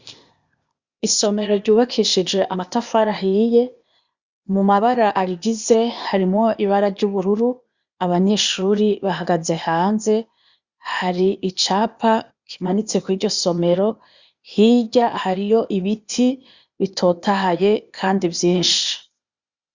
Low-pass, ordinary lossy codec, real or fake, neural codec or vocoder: 7.2 kHz; Opus, 64 kbps; fake; codec, 16 kHz, 0.8 kbps, ZipCodec